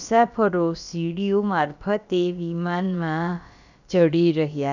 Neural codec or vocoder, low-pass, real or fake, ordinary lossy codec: codec, 16 kHz, about 1 kbps, DyCAST, with the encoder's durations; 7.2 kHz; fake; none